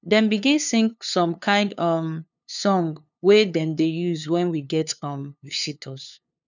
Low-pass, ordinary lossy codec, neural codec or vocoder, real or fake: 7.2 kHz; none; codec, 16 kHz, 2 kbps, FunCodec, trained on LibriTTS, 25 frames a second; fake